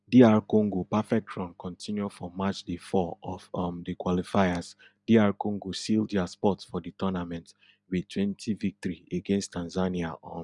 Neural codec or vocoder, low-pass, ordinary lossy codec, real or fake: none; 10.8 kHz; none; real